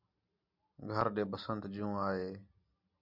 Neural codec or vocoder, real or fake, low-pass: none; real; 5.4 kHz